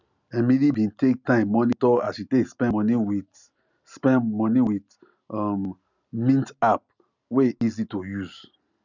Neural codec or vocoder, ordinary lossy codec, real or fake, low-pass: none; none; real; 7.2 kHz